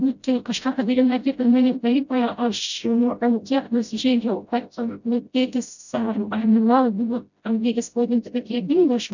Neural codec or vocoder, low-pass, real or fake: codec, 16 kHz, 0.5 kbps, FreqCodec, smaller model; 7.2 kHz; fake